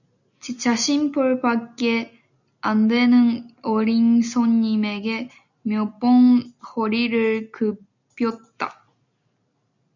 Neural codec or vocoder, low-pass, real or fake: none; 7.2 kHz; real